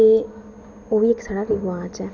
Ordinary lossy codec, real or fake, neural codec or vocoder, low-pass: none; real; none; 7.2 kHz